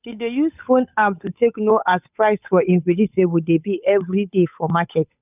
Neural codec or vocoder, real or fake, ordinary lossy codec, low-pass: codec, 16 kHz, 8 kbps, FunCodec, trained on Chinese and English, 25 frames a second; fake; none; 3.6 kHz